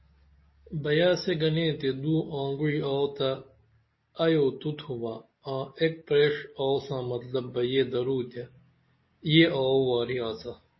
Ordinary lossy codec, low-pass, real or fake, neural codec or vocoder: MP3, 24 kbps; 7.2 kHz; real; none